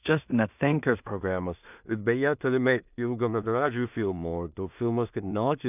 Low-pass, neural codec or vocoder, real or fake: 3.6 kHz; codec, 16 kHz in and 24 kHz out, 0.4 kbps, LongCat-Audio-Codec, two codebook decoder; fake